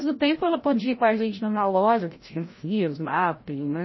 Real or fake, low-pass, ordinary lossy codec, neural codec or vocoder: fake; 7.2 kHz; MP3, 24 kbps; codec, 16 kHz, 0.5 kbps, FreqCodec, larger model